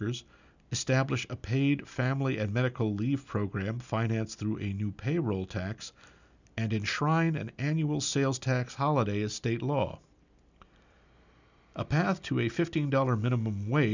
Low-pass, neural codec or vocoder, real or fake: 7.2 kHz; none; real